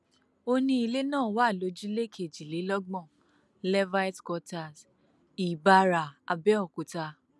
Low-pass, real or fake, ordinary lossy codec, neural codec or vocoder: none; real; none; none